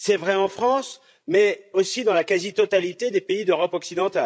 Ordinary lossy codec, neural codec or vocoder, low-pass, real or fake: none; codec, 16 kHz, 16 kbps, FreqCodec, larger model; none; fake